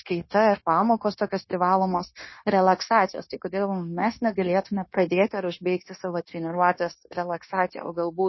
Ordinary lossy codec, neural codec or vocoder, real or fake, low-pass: MP3, 24 kbps; codec, 16 kHz, 0.9 kbps, LongCat-Audio-Codec; fake; 7.2 kHz